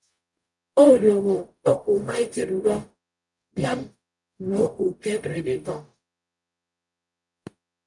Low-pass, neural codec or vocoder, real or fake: 10.8 kHz; codec, 44.1 kHz, 0.9 kbps, DAC; fake